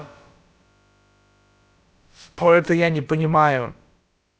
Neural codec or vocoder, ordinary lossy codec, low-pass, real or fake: codec, 16 kHz, about 1 kbps, DyCAST, with the encoder's durations; none; none; fake